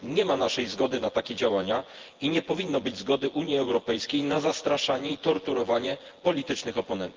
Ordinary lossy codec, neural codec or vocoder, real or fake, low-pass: Opus, 16 kbps; vocoder, 24 kHz, 100 mel bands, Vocos; fake; 7.2 kHz